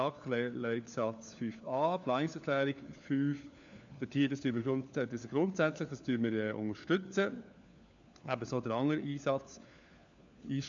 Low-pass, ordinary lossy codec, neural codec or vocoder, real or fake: 7.2 kHz; none; codec, 16 kHz, 4 kbps, FunCodec, trained on LibriTTS, 50 frames a second; fake